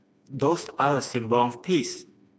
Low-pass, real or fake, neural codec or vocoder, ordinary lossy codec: none; fake; codec, 16 kHz, 2 kbps, FreqCodec, smaller model; none